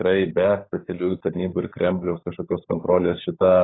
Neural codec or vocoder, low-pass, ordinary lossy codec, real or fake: codec, 16 kHz, 16 kbps, FunCodec, trained on LibriTTS, 50 frames a second; 7.2 kHz; AAC, 16 kbps; fake